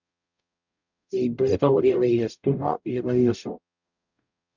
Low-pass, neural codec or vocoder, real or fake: 7.2 kHz; codec, 44.1 kHz, 0.9 kbps, DAC; fake